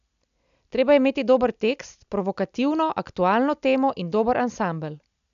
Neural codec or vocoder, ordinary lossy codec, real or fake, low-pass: none; none; real; 7.2 kHz